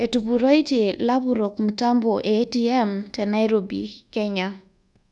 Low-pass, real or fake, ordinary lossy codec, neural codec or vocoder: 10.8 kHz; fake; none; codec, 24 kHz, 1.2 kbps, DualCodec